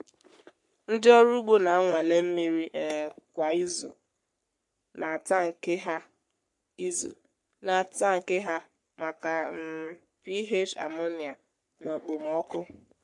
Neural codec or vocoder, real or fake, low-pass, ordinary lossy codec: codec, 44.1 kHz, 3.4 kbps, Pupu-Codec; fake; 10.8 kHz; MP3, 64 kbps